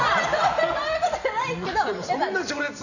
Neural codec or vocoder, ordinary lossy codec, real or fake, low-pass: none; none; real; 7.2 kHz